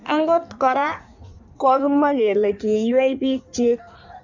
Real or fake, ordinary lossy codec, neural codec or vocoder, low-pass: fake; none; codec, 16 kHz in and 24 kHz out, 1.1 kbps, FireRedTTS-2 codec; 7.2 kHz